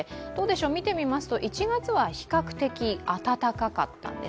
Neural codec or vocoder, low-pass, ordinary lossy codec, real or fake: none; none; none; real